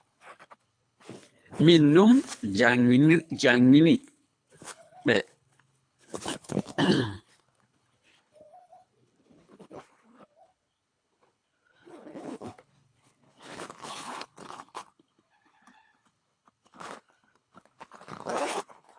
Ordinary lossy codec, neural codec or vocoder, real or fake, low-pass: AAC, 64 kbps; codec, 24 kHz, 3 kbps, HILCodec; fake; 9.9 kHz